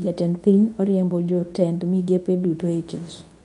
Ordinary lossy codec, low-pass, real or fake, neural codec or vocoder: none; 10.8 kHz; fake; codec, 24 kHz, 0.9 kbps, WavTokenizer, medium speech release version 2